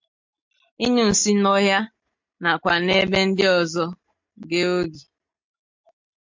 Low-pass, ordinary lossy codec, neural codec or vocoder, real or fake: 7.2 kHz; MP3, 48 kbps; none; real